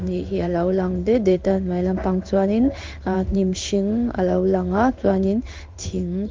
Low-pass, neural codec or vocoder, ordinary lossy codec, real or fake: 7.2 kHz; codec, 16 kHz in and 24 kHz out, 1 kbps, XY-Tokenizer; Opus, 24 kbps; fake